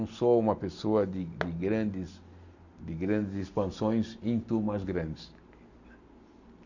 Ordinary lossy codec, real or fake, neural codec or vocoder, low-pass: none; real; none; 7.2 kHz